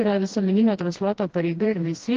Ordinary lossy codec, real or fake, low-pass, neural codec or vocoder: Opus, 16 kbps; fake; 7.2 kHz; codec, 16 kHz, 1 kbps, FreqCodec, smaller model